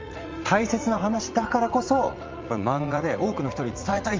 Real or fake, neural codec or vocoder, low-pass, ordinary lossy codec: fake; vocoder, 44.1 kHz, 80 mel bands, Vocos; 7.2 kHz; Opus, 32 kbps